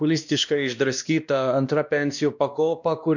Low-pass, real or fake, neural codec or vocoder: 7.2 kHz; fake; codec, 16 kHz, 1 kbps, X-Codec, WavLM features, trained on Multilingual LibriSpeech